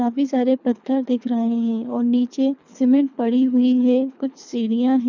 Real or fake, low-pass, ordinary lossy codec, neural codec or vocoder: fake; 7.2 kHz; none; codec, 24 kHz, 3 kbps, HILCodec